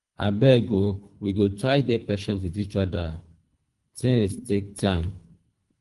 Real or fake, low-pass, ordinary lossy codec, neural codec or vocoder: fake; 10.8 kHz; Opus, 32 kbps; codec, 24 kHz, 3 kbps, HILCodec